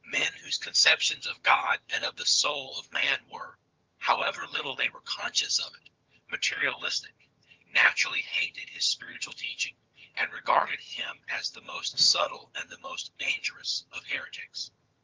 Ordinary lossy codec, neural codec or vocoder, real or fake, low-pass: Opus, 32 kbps; vocoder, 22.05 kHz, 80 mel bands, HiFi-GAN; fake; 7.2 kHz